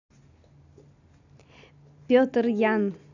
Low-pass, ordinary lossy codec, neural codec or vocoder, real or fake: 7.2 kHz; none; none; real